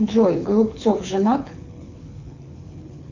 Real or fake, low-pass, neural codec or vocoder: fake; 7.2 kHz; codec, 24 kHz, 6 kbps, HILCodec